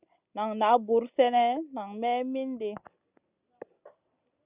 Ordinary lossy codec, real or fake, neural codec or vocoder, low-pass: Opus, 64 kbps; real; none; 3.6 kHz